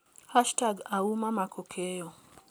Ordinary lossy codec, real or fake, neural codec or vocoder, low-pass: none; real; none; none